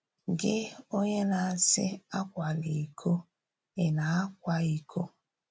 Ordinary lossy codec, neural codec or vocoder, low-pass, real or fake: none; none; none; real